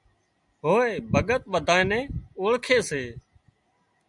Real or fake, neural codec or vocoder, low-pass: real; none; 10.8 kHz